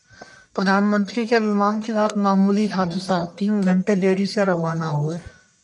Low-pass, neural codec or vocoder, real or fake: 10.8 kHz; codec, 44.1 kHz, 1.7 kbps, Pupu-Codec; fake